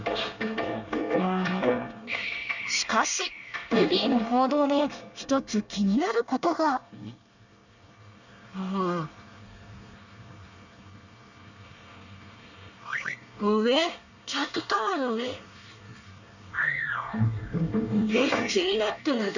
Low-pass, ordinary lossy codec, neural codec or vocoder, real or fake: 7.2 kHz; none; codec, 24 kHz, 1 kbps, SNAC; fake